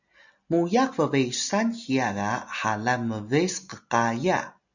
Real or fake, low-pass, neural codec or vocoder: real; 7.2 kHz; none